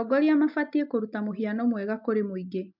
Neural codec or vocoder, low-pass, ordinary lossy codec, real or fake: none; 5.4 kHz; MP3, 48 kbps; real